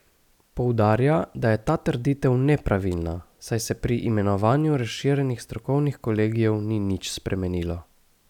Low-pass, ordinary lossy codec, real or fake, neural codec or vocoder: 19.8 kHz; none; real; none